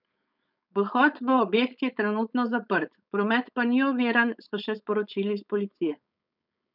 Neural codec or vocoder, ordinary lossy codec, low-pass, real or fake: codec, 16 kHz, 4.8 kbps, FACodec; none; 5.4 kHz; fake